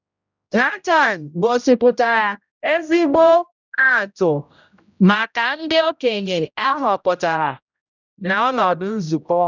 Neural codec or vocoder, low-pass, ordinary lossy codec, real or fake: codec, 16 kHz, 0.5 kbps, X-Codec, HuBERT features, trained on general audio; 7.2 kHz; none; fake